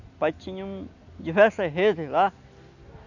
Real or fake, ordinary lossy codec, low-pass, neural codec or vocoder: real; none; 7.2 kHz; none